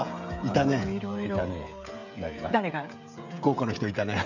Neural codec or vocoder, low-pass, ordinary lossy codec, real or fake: codec, 16 kHz, 16 kbps, FreqCodec, smaller model; 7.2 kHz; none; fake